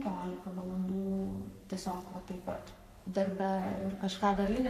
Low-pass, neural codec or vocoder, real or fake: 14.4 kHz; codec, 44.1 kHz, 3.4 kbps, Pupu-Codec; fake